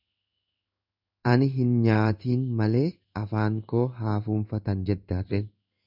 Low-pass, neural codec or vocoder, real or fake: 5.4 kHz; codec, 16 kHz in and 24 kHz out, 1 kbps, XY-Tokenizer; fake